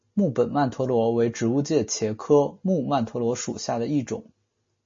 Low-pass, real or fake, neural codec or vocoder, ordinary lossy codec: 7.2 kHz; real; none; MP3, 32 kbps